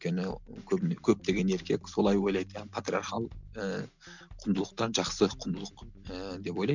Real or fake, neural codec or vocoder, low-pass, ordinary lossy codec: real; none; 7.2 kHz; none